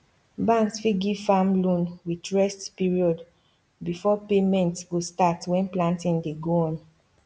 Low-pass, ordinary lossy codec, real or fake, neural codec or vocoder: none; none; real; none